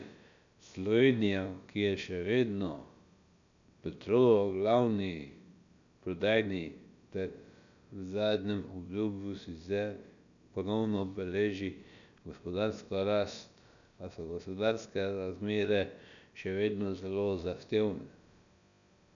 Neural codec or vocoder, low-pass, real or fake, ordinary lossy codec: codec, 16 kHz, about 1 kbps, DyCAST, with the encoder's durations; 7.2 kHz; fake; none